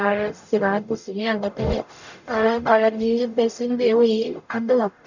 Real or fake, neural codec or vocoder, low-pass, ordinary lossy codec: fake; codec, 44.1 kHz, 0.9 kbps, DAC; 7.2 kHz; none